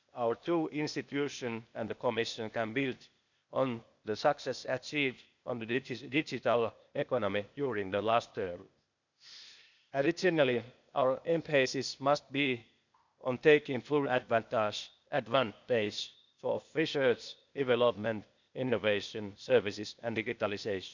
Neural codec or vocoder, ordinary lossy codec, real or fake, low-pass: codec, 16 kHz, 0.8 kbps, ZipCodec; none; fake; 7.2 kHz